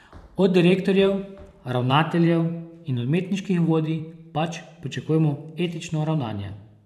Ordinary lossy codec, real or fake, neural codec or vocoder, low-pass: none; fake; vocoder, 44.1 kHz, 128 mel bands every 512 samples, BigVGAN v2; 14.4 kHz